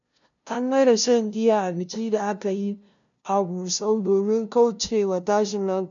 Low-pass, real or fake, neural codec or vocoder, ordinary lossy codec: 7.2 kHz; fake; codec, 16 kHz, 0.5 kbps, FunCodec, trained on LibriTTS, 25 frames a second; none